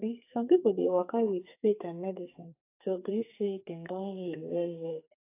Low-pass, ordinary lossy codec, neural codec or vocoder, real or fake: 3.6 kHz; AAC, 24 kbps; codec, 44.1 kHz, 2.6 kbps, SNAC; fake